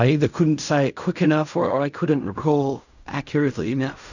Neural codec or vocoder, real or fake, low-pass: codec, 16 kHz in and 24 kHz out, 0.4 kbps, LongCat-Audio-Codec, fine tuned four codebook decoder; fake; 7.2 kHz